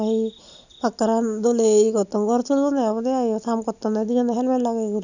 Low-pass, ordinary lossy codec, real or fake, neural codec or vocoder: 7.2 kHz; none; real; none